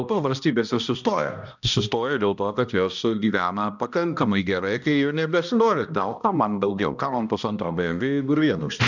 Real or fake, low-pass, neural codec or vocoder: fake; 7.2 kHz; codec, 16 kHz, 1 kbps, X-Codec, HuBERT features, trained on balanced general audio